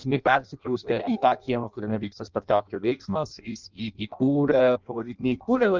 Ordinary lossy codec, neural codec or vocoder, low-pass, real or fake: Opus, 24 kbps; codec, 16 kHz in and 24 kHz out, 0.6 kbps, FireRedTTS-2 codec; 7.2 kHz; fake